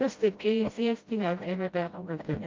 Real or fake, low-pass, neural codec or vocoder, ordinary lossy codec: fake; 7.2 kHz; codec, 16 kHz, 0.5 kbps, FreqCodec, smaller model; Opus, 24 kbps